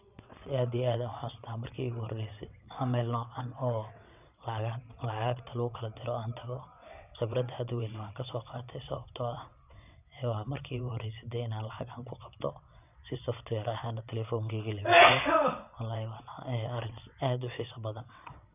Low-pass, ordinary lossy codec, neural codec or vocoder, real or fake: 3.6 kHz; none; vocoder, 22.05 kHz, 80 mel bands, WaveNeXt; fake